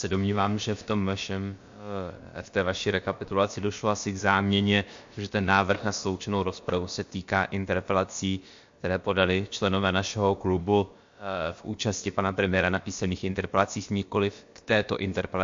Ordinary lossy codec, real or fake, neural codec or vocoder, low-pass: MP3, 48 kbps; fake; codec, 16 kHz, about 1 kbps, DyCAST, with the encoder's durations; 7.2 kHz